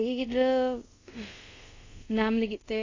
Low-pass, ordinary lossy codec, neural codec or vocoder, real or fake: 7.2 kHz; AAC, 32 kbps; codec, 24 kHz, 0.5 kbps, DualCodec; fake